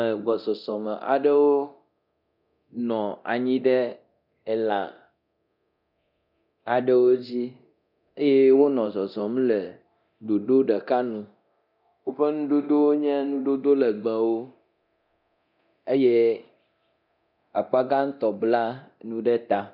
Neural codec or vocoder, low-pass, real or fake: codec, 24 kHz, 0.9 kbps, DualCodec; 5.4 kHz; fake